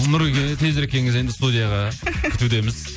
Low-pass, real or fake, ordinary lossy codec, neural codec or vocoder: none; real; none; none